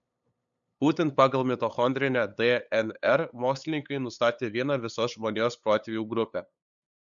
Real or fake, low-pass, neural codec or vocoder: fake; 7.2 kHz; codec, 16 kHz, 8 kbps, FunCodec, trained on LibriTTS, 25 frames a second